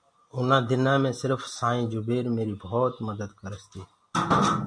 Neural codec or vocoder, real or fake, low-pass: none; real; 9.9 kHz